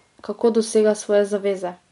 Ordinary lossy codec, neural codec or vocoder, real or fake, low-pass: MP3, 64 kbps; none; real; 10.8 kHz